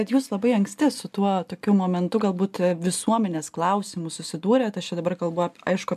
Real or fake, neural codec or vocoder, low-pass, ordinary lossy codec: real; none; 14.4 kHz; AAC, 96 kbps